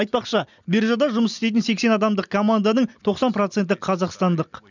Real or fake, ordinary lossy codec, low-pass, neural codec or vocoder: real; none; 7.2 kHz; none